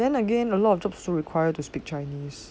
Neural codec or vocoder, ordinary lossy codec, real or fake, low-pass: none; none; real; none